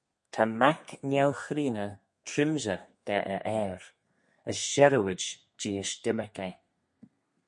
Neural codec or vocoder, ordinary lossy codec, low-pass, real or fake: codec, 32 kHz, 1.9 kbps, SNAC; MP3, 48 kbps; 10.8 kHz; fake